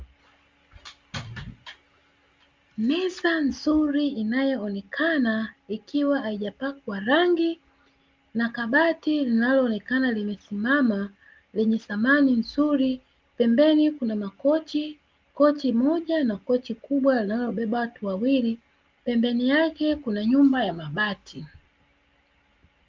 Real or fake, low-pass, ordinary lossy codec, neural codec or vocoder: real; 7.2 kHz; Opus, 32 kbps; none